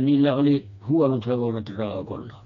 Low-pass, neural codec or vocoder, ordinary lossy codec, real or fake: 7.2 kHz; codec, 16 kHz, 2 kbps, FreqCodec, smaller model; none; fake